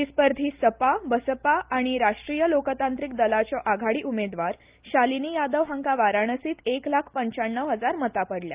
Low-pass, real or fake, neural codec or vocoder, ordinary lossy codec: 3.6 kHz; real; none; Opus, 24 kbps